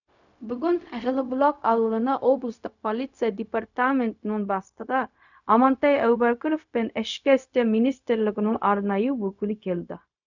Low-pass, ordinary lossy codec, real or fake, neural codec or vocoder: 7.2 kHz; none; fake; codec, 16 kHz, 0.4 kbps, LongCat-Audio-Codec